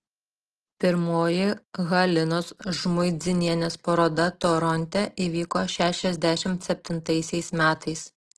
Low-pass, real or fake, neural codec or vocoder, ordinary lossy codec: 10.8 kHz; real; none; Opus, 16 kbps